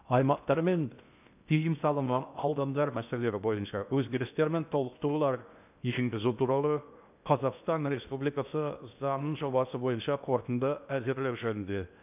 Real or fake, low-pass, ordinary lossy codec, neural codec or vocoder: fake; 3.6 kHz; none; codec, 16 kHz in and 24 kHz out, 0.6 kbps, FocalCodec, streaming, 4096 codes